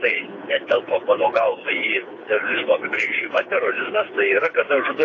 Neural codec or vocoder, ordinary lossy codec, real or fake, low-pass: codec, 16 kHz, 4 kbps, FreqCodec, smaller model; AAC, 32 kbps; fake; 7.2 kHz